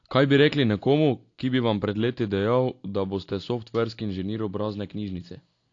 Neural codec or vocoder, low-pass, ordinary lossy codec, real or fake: none; 7.2 kHz; AAC, 48 kbps; real